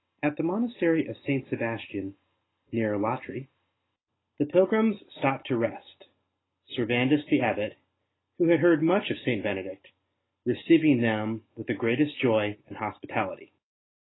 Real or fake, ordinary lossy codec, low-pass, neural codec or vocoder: real; AAC, 16 kbps; 7.2 kHz; none